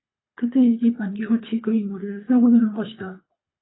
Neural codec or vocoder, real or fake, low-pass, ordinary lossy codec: codec, 24 kHz, 3 kbps, HILCodec; fake; 7.2 kHz; AAC, 16 kbps